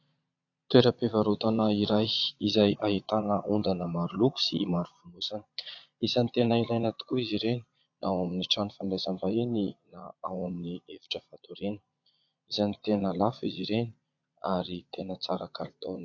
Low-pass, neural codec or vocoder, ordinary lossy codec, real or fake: 7.2 kHz; vocoder, 22.05 kHz, 80 mel bands, Vocos; AAC, 48 kbps; fake